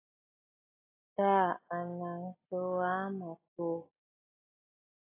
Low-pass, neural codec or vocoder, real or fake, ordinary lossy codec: 3.6 kHz; none; real; AAC, 16 kbps